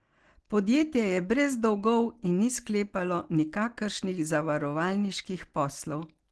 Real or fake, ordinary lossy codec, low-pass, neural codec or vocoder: real; Opus, 16 kbps; 10.8 kHz; none